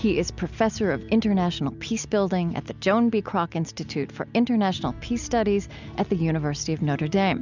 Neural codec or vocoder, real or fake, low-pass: none; real; 7.2 kHz